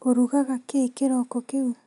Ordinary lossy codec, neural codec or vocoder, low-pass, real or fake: none; none; 10.8 kHz; real